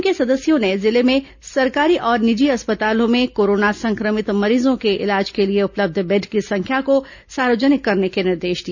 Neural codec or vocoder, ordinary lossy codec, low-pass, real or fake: none; none; 7.2 kHz; real